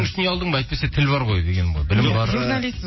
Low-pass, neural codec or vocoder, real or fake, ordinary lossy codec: 7.2 kHz; none; real; MP3, 24 kbps